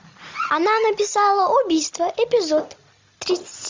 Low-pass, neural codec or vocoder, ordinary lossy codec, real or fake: 7.2 kHz; none; MP3, 64 kbps; real